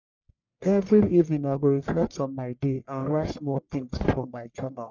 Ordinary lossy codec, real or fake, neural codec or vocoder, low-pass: none; fake; codec, 44.1 kHz, 1.7 kbps, Pupu-Codec; 7.2 kHz